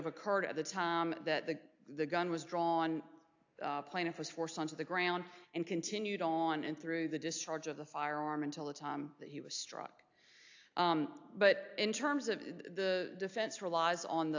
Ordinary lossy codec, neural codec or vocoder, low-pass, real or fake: AAC, 48 kbps; none; 7.2 kHz; real